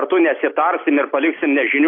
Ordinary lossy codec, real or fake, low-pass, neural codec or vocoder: AAC, 32 kbps; real; 5.4 kHz; none